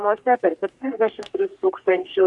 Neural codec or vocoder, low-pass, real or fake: codec, 44.1 kHz, 3.4 kbps, Pupu-Codec; 10.8 kHz; fake